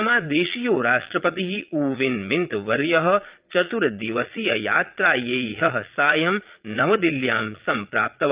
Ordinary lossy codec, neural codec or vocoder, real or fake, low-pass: Opus, 32 kbps; vocoder, 22.05 kHz, 80 mel bands, Vocos; fake; 3.6 kHz